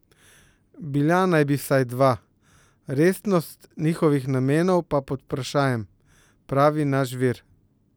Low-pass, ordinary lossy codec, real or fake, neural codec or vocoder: none; none; real; none